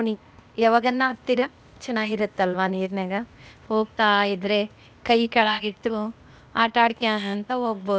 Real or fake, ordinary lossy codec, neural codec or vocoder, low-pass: fake; none; codec, 16 kHz, 0.8 kbps, ZipCodec; none